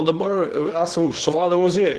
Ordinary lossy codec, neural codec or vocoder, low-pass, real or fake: Opus, 16 kbps; codec, 24 kHz, 0.9 kbps, WavTokenizer, small release; 10.8 kHz; fake